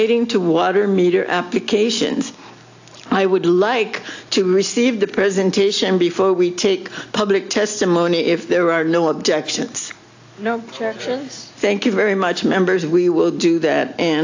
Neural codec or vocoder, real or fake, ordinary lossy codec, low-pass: none; real; AAC, 48 kbps; 7.2 kHz